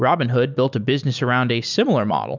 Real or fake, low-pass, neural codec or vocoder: real; 7.2 kHz; none